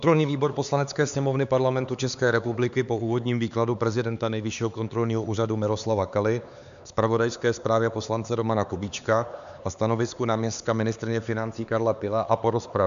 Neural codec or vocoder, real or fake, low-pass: codec, 16 kHz, 4 kbps, X-Codec, HuBERT features, trained on LibriSpeech; fake; 7.2 kHz